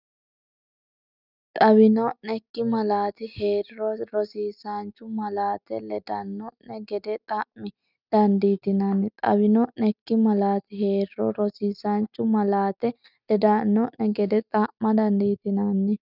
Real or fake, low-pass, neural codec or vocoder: real; 5.4 kHz; none